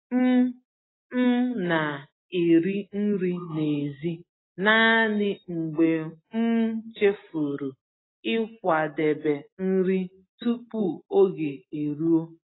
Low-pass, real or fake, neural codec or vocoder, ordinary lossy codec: 7.2 kHz; real; none; AAC, 16 kbps